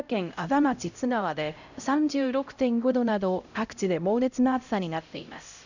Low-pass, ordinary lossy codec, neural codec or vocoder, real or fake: 7.2 kHz; none; codec, 16 kHz, 0.5 kbps, X-Codec, HuBERT features, trained on LibriSpeech; fake